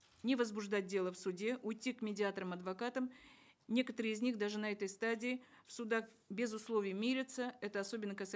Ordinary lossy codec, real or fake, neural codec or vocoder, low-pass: none; real; none; none